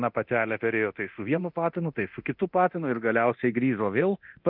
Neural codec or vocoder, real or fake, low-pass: codec, 24 kHz, 0.9 kbps, DualCodec; fake; 5.4 kHz